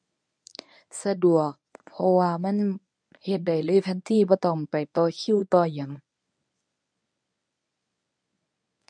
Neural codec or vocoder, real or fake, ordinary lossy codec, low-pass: codec, 24 kHz, 0.9 kbps, WavTokenizer, medium speech release version 1; fake; none; 9.9 kHz